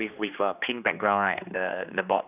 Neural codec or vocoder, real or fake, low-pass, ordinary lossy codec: codec, 16 kHz, 2 kbps, X-Codec, HuBERT features, trained on general audio; fake; 3.6 kHz; none